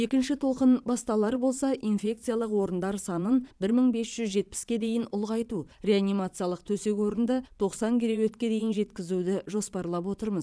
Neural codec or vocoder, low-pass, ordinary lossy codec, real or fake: vocoder, 22.05 kHz, 80 mel bands, Vocos; none; none; fake